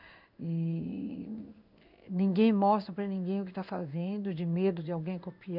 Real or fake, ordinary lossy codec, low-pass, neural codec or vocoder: fake; none; 5.4 kHz; codec, 16 kHz in and 24 kHz out, 1 kbps, XY-Tokenizer